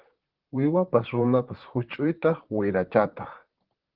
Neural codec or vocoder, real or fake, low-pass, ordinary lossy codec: vocoder, 44.1 kHz, 128 mel bands, Pupu-Vocoder; fake; 5.4 kHz; Opus, 16 kbps